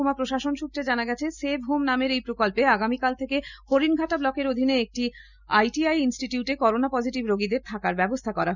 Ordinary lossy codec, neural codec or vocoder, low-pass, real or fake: none; none; 7.2 kHz; real